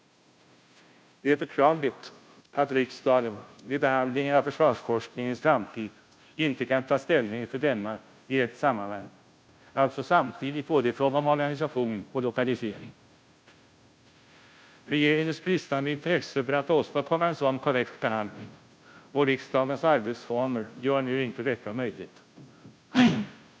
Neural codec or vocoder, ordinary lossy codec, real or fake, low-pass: codec, 16 kHz, 0.5 kbps, FunCodec, trained on Chinese and English, 25 frames a second; none; fake; none